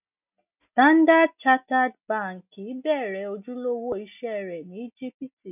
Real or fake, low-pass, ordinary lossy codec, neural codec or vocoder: real; 3.6 kHz; none; none